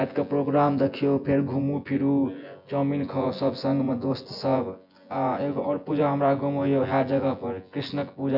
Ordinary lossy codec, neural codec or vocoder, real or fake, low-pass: none; vocoder, 24 kHz, 100 mel bands, Vocos; fake; 5.4 kHz